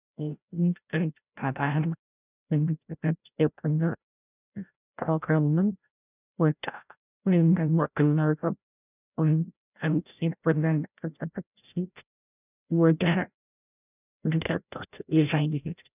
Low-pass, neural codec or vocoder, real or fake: 3.6 kHz; codec, 16 kHz, 0.5 kbps, FreqCodec, larger model; fake